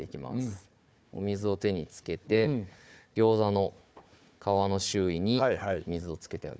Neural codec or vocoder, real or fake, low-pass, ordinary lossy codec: codec, 16 kHz, 4 kbps, FunCodec, trained on Chinese and English, 50 frames a second; fake; none; none